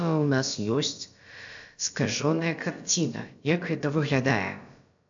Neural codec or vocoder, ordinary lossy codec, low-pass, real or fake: codec, 16 kHz, about 1 kbps, DyCAST, with the encoder's durations; AAC, 64 kbps; 7.2 kHz; fake